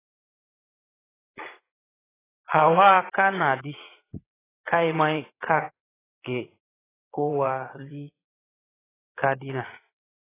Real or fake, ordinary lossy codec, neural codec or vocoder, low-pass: fake; AAC, 16 kbps; vocoder, 22.05 kHz, 80 mel bands, Vocos; 3.6 kHz